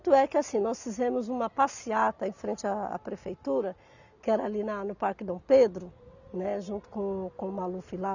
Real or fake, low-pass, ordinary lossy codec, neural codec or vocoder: real; 7.2 kHz; none; none